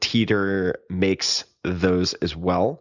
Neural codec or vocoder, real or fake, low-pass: none; real; 7.2 kHz